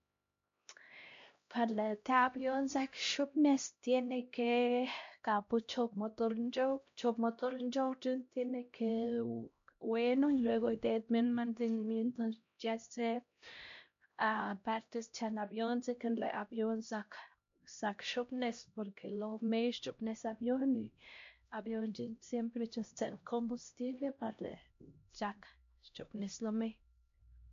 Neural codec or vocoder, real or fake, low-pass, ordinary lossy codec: codec, 16 kHz, 1 kbps, X-Codec, HuBERT features, trained on LibriSpeech; fake; 7.2 kHz; MP3, 48 kbps